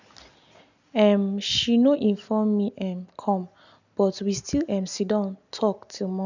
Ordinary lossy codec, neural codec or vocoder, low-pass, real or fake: none; none; 7.2 kHz; real